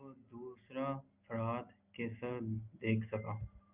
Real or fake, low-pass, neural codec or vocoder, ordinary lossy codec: real; 3.6 kHz; none; Opus, 64 kbps